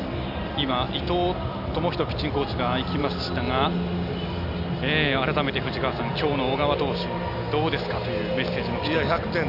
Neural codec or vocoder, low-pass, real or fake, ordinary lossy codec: none; 5.4 kHz; real; none